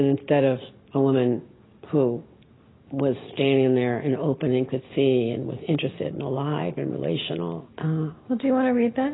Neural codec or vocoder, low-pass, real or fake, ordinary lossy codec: none; 7.2 kHz; real; AAC, 16 kbps